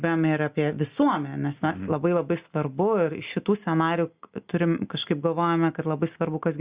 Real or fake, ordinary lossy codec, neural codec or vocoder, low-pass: real; Opus, 64 kbps; none; 3.6 kHz